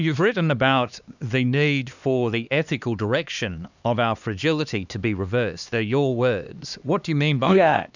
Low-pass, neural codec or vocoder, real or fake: 7.2 kHz; codec, 16 kHz, 2 kbps, X-Codec, HuBERT features, trained on LibriSpeech; fake